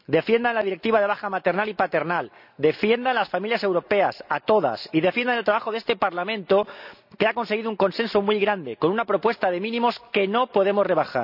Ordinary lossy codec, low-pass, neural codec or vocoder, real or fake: none; 5.4 kHz; none; real